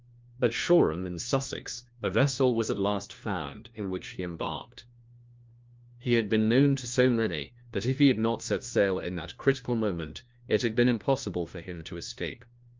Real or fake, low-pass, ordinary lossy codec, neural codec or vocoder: fake; 7.2 kHz; Opus, 24 kbps; codec, 16 kHz, 1 kbps, FunCodec, trained on LibriTTS, 50 frames a second